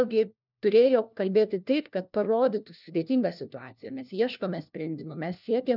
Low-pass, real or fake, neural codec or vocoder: 5.4 kHz; fake; codec, 16 kHz, 1 kbps, FunCodec, trained on LibriTTS, 50 frames a second